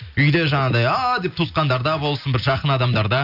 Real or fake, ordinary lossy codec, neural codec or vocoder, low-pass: real; none; none; 5.4 kHz